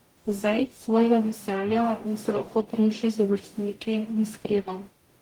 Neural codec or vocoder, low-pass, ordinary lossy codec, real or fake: codec, 44.1 kHz, 0.9 kbps, DAC; 19.8 kHz; Opus, 24 kbps; fake